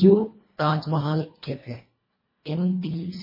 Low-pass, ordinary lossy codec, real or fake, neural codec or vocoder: 5.4 kHz; MP3, 24 kbps; fake; codec, 24 kHz, 1.5 kbps, HILCodec